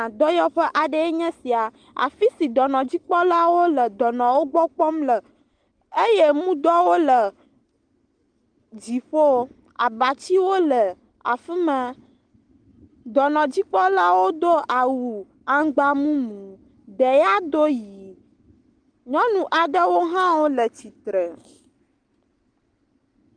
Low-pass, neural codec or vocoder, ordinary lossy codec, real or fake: 9.9 kHz; none; Opus, 24 kbps; real